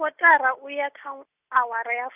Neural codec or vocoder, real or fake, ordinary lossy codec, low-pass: none; real; none; 3.6 kHz